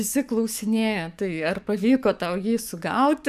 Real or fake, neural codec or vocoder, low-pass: fake; codec, 44.1 kHz, 7.8 kbps, DAC; 14.4 kHz